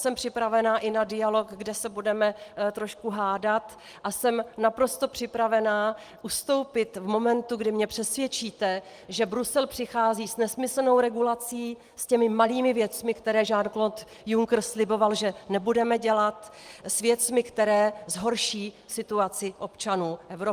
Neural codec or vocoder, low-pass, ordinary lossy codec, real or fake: none; 14.4 kHz; Opus, 24 kbps; real